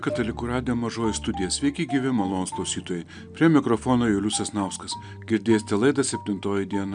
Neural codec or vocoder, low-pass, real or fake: none; 9.9 kHz; real